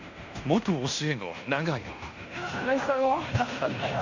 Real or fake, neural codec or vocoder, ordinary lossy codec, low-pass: fake; codec, 16 kHz in and 24 kHz out, 0.9 kbps, LongCat-Audio-Codec, fine tuned four codebook decoder; Opus, 64 kbps; 7.2 kHz